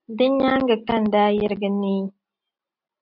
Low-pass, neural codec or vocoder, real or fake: 5.4 kHz; none; real